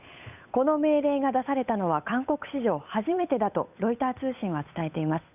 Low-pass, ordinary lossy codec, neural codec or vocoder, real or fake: 3.6 kHz; none; codec, 16 kHz, 8 kbps, FunCodec, trained on Chinese and English, 25 frames a second; fake